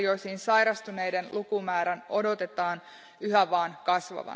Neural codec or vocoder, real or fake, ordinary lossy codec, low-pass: none; real; none; none